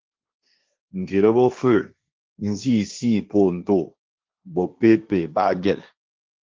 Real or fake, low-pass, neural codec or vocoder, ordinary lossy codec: fake; 7.2 kHz; codec, 16 kHz, 1.1 kbps, Voila-Tokenizer; Opus, 24 kbps